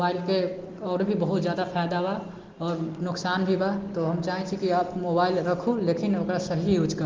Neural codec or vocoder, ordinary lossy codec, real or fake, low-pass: none; Opus, 16 kbps; real; 7.2 kHz